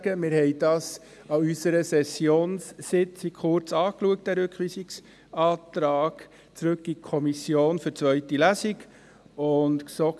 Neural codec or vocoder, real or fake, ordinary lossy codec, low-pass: none; real; none; none